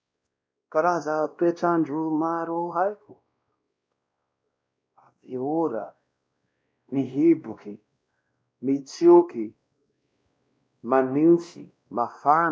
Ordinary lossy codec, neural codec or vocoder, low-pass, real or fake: none; codec, 16 kHz, 1 kbps, X-Codec, WavLM features, trained on Multilingual LibriSpeech; none; fake